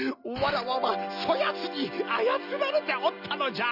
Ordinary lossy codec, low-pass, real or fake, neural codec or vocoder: none; 5.4 kHz; real; none